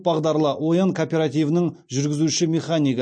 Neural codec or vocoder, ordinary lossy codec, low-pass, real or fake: none; MP3, 48 kbps; 9.9 kHz; real